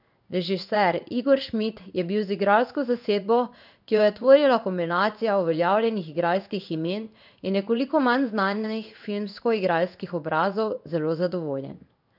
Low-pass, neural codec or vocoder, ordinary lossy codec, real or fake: 5.4 kHz; codec, 16 kHz in and 24 kHz out, 1 kbps, XY-Tokenizer; none; fake